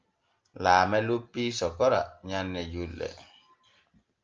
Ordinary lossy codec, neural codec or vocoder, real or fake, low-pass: Opus, 24 kbps; none; real; 7.2 kHz